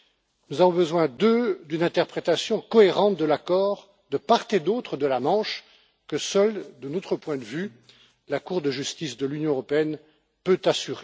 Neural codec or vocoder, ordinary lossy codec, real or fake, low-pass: none; none; real; none